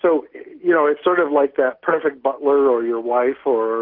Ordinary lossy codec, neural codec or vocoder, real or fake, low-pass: Opus, 16 kbps; none; real; 5.4 kHz